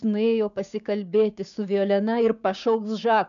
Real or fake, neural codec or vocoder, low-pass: fake; codec, 16 kHz, 6 kbps, DAC; 7.2 kHz